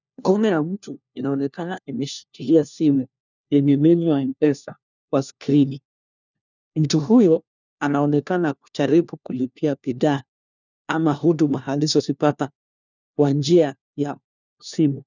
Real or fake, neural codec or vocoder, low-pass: fake; codec, 16 kHz, 1 kbps, FunCodec, trained on LibriTTS, 50 frames a second; 7.2 kHz